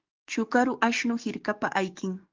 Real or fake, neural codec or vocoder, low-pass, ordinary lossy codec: fake; codec, 44.1 kHz, 7.8 kbps, DAC; 7.2 kHz; Opus, 32 kbps